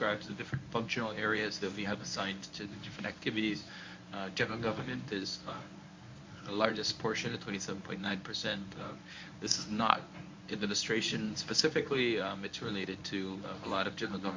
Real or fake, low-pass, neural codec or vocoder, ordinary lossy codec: fake; 7.2 kHz; codec, 24 kHz, 0.9 kbps, WavTokenizer, medium speech release version 1; MP3, 48 kbps